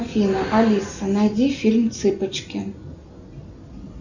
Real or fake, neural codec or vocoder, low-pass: real; none; 7.2 kHz